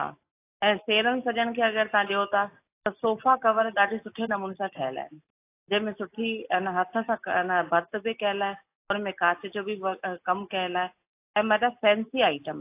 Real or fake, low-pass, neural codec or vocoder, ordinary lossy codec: real; 3.6 kHz; none; AAC, 24 kbps